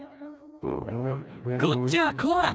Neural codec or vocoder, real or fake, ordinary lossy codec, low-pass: codec, 16 kHz, 1 kbps, FreqCodec, larger model; fake; none; none